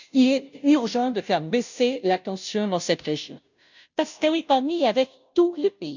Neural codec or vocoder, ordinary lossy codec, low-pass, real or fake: codec, 16 kHz, 0.5 kbps, FunCodec, trained on Chinese and English, 25 frames a second; none; 7.2 kHz; fake